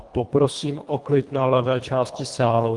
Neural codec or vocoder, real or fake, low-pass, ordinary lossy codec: codec, 24 kHz, 1.5 kbps, HILCodec; fake; 10.8 kHz; Opus, 24 kbps